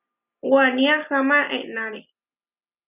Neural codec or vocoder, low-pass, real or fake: none; 3.6 kHz; real